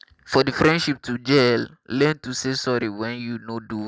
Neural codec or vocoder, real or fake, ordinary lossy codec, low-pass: none; real; none; none